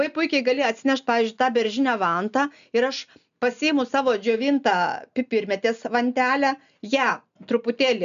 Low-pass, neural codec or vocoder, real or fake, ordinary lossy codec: 7.2 kHz; none; real; MP3, 96 kbps